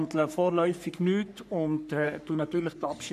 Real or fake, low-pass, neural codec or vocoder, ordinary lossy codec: fake; 14.4 kHz; codec, 44.1 kHz, 3.4 kbps, Pupu-Codec; none